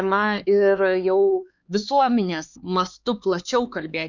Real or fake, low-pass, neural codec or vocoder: fake; 7.2 kHz; codec, 16 kHz, 4 kbps, X-Codec, HuBERT features, trained on LibriSpeech